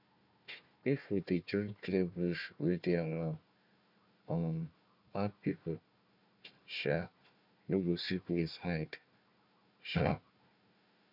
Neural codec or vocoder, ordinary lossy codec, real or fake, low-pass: codec, 16 kHz, 1 kbps, FunCodec, trained on Chinese and English, 50 frames a second; none; fake; 5.4 kHz